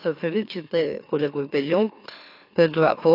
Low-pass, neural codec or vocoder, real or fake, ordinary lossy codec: 5.4 kHz; autoencoder, 44.1 kHz, a latent of 192 numbers a frame, MeloTTS; fake; AAC, 32 kbps